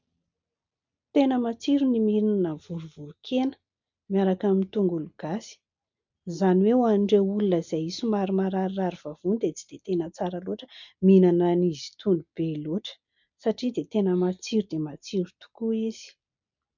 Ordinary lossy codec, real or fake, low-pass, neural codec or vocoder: MP3, 64 kbps; real; 7.2 kHz; none